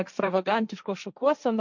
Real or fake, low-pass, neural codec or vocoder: fake; 7.2 kHz; codec, 16 kHz, 1.1 kbps, Voila-Tokenizer